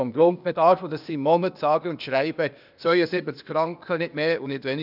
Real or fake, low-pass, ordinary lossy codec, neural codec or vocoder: fake; 5.4 kHz; none; codec, 16 kHz, 0.8 kbps, ZipCodec